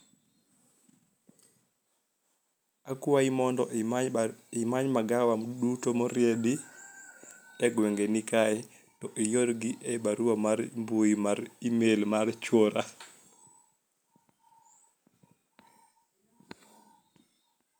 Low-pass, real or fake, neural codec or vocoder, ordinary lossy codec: none; real; none; none